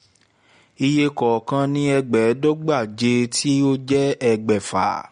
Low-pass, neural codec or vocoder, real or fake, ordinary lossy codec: 9.9 kHz; none; real; MP3, 48 kbps